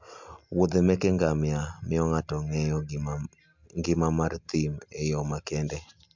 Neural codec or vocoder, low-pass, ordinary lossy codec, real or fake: none; 7.2 kHz; MP3, 64 kbps; real